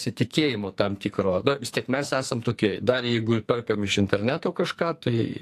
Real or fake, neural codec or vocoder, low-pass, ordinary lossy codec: fake; codec, 44.1 kHz, 2.6 kbps, SNAC; 14.4 kHz; AAC, 64 kbps